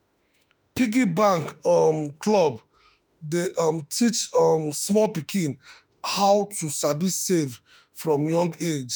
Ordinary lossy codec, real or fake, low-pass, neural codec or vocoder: none; fake; none; autoencoder, 48 kHz, 32 numbers a frame, DAC-VAE, trained on Japanese speech